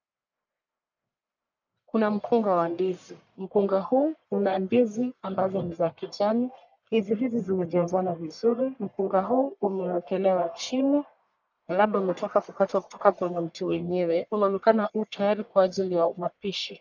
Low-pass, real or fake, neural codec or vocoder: 7.2 kHz; fake; codec, 44.1 kHz, 1.7 kbps, Pupu-Codec